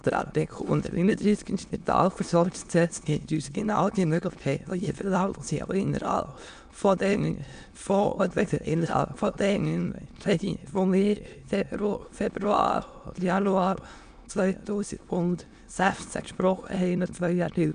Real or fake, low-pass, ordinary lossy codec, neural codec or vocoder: fake; 9.9 kHz; none; autoencoder, 22.05 kHz, a latent of 192 numbers a frame, VITS, trained on many speakers